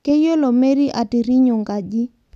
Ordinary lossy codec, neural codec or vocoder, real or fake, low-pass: none; none; real; 14.4 kHz